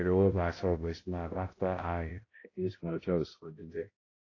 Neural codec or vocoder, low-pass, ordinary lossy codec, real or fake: codec, 16 kHz, 0.5 kbps, X-Codec, HuBERT features, trained on balanced general audio; 7.2 kHz; AAC, 32 kbps; fake